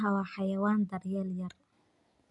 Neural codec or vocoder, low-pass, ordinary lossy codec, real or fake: none; 10.8 kHz; none; real